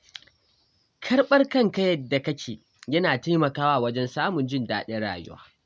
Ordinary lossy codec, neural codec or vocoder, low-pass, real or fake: none; none; none; real